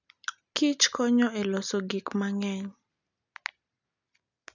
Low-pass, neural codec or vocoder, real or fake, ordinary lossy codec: 7.2 kHz; none; real; none